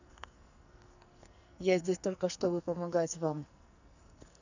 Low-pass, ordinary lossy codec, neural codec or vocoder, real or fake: 7.2 kHz; none; codec, 44.1 kHz, 2.6 kbps, SNAC; fake